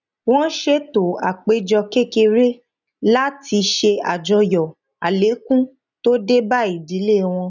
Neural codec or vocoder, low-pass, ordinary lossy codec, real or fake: none; 7.2 kHz; none; real